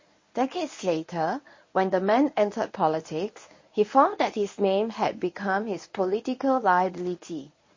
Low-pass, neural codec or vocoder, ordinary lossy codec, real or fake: 7.2 kHz; codec, 24 kHz, 0.9 kbps, WavTokenizer, medium speech release version 1; MP3, 32 kbps; fake